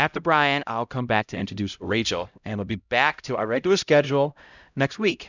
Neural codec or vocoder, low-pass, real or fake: codec, 16 kHz, 0.5 kbps, X-Codec, HuBERT features, trained on LibriSpeech; 7.2 kHz; fake